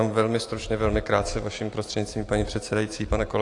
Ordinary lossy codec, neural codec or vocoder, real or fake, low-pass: AAC, 48 kbps; vocoder, 44.1 kHz, 128 mel bands every 512 samples, BigVGAN v2; fake; 10.8 kHz